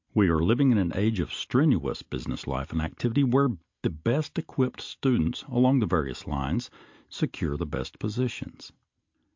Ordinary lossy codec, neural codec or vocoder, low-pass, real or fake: MP3, 48 kbps; none; 7.2 kHz; real